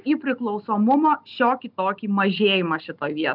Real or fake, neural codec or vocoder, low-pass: real; none; 5.4 kHz